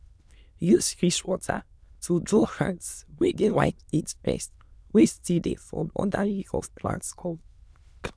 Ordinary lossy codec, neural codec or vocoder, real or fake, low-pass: none; autoencoder, 22.05 kHz, a latent of 192 numbers a frame, VITS, trained on many speakers; fake; none